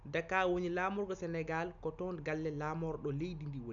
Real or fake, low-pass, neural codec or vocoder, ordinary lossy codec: real; 7.2 kHz; none; none